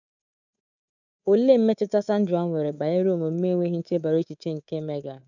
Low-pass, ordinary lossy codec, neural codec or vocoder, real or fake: 7.2 kHz; none; codec, 24 kHz, 3.1 kbps, DualCodec; fake